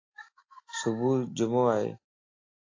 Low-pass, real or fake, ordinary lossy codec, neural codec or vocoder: 7.2 kHz; real; AAC, 32 kbps; none